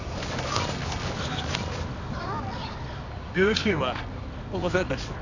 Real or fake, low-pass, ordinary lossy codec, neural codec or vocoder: fake; 7.2 kHz; none; codec, 24 kHz, 0.9 kbps, WavTokenizer, medium music audio release